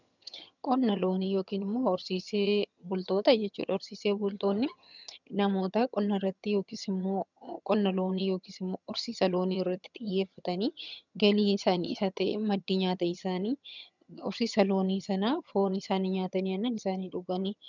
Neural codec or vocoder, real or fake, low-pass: vocoder, 22.05 kHz, 80 mel bands, HiFi-GAN; fake; 7.2 kHz